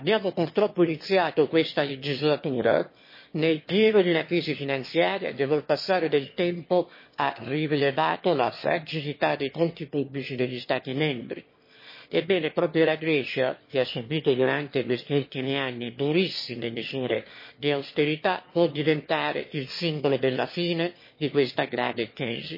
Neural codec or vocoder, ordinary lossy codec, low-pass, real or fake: autoencoder, 22.05 kHz, a latent of 192 numbers a frame, VITS, trained on one speaker; MP3, 24 kbps; 5.4 kHz; fake